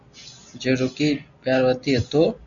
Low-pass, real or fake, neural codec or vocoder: 7.2 kHz; real; none